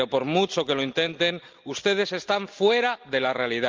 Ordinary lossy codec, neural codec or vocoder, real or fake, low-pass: Opus, 24 kbps; none; real; 7.2 kHz